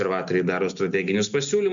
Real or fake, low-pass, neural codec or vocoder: real; 7.2 kHz; none